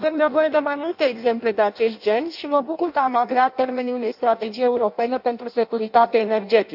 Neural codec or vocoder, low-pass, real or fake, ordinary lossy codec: codec, 16 kHz in and 24 kHz out, 0.6 kbps, FireRedTTS-2 codec; 5.4 kHz; fake; AAC, 48 kbps